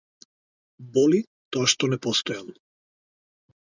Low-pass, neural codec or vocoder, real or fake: 7.2 kHz; none; real